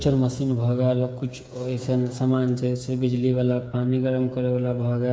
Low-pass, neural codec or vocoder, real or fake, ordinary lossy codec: none; codec, 16 kHz, 8 kbps, FreqCodec, smaller model; fake; none